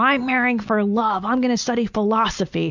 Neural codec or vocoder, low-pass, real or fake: none; 7.2 kHz; real